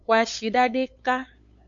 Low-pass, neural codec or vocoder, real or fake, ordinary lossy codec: 7.2 kHz; codec, 16 kHz, 8 kbps, FunCodec, trained on LibriTTS, 25 frames a second; fake; AAC, 64 kbps